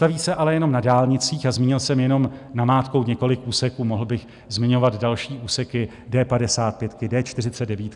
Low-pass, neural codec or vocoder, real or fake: 10.8 kHz; none; real